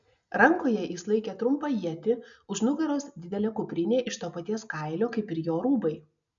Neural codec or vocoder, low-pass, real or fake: none; 7.2 kHz; real